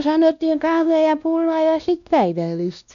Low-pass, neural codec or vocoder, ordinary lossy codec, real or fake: 7.2 kHz; codec, 16 kHz, 1 kbps, X-Codec, WavLM features, trained on Multilingual LibriSpeech; none; fake